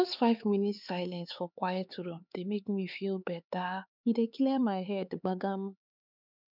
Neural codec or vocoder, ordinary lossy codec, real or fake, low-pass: codec, 16 kHz, 4 kbps, X-Codec, HuBERT features, trained on LibriSpeech; none; fake; 5.4 kHz